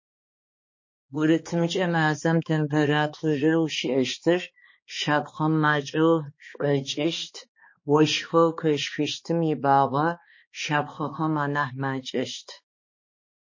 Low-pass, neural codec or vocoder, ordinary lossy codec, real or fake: 7.2 kHz; codec, 16 kHz, 2 kbps, X-Codec, HuBERT features, trained on balanced general audio; MP3, 32 kbps; fake